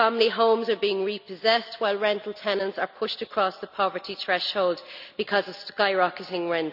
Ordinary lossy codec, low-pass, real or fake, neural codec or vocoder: none; 5.4 kHz; real; none